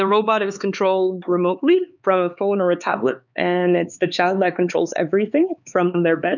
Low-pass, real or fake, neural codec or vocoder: 7.2 kHz; fake; codec, 16 kHz, 4 kbps, X-Codec, HuBERT features, trained on LibriSpeech